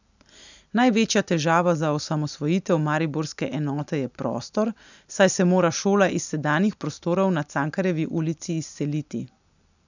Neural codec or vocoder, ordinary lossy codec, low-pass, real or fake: none; none; 7.2 kHz; real